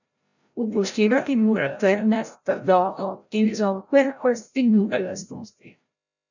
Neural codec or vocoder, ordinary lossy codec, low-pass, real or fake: codec, 16 kHz, 0.5 kbps, FreqCodec, larger model; none; 7.2 kHz; fake